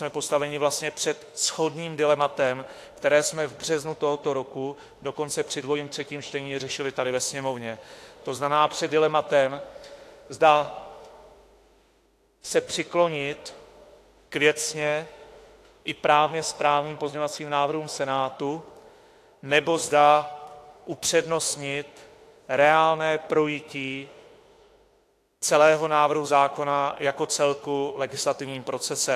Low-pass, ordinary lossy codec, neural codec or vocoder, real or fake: 14.4 kHz; AAC, 64 kbps; autoencoder, 48 kHz, 32 numbers a frame, DAC-VAE, trained on Japanese speech; fake